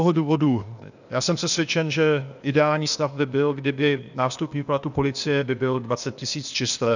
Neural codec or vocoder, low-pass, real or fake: codec, 16 kHz, 0.8 kbps, ZipCodec; 7.2 kHz; fake